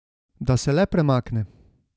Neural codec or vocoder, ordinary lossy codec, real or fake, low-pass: none; none; real; none